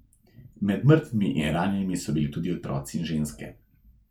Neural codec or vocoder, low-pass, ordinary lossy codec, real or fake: none; 19.8 kHz; none; real